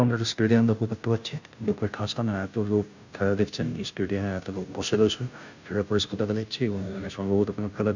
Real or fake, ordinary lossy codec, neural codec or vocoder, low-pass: fake; none; codec, 16 kHz, 0.5 kbps, FunCodec, trained on Chinese and English, 25 frames a second; 7.2 kHz